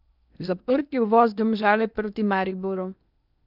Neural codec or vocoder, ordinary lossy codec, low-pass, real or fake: codec, 16 kHz in and 24 kHz out, 0.8 kbps, FocalCodec, streaming, 65536 codes; none; 5.4 kHz; fake